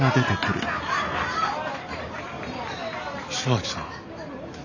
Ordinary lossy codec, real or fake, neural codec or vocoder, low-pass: none; real; none; 7.2 kHz